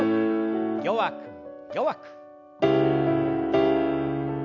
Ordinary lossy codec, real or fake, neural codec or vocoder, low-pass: none; real; none; 7.2 kHz